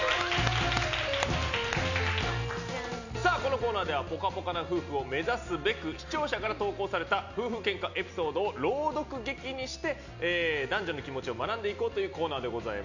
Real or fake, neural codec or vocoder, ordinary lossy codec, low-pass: real; none; none; 7.2 kHz